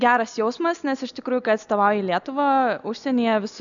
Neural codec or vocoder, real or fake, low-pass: none; real; 7.2 kHz